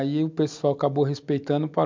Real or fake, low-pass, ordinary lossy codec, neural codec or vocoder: real; 7.2 kHz; MP3, 64 kbps; none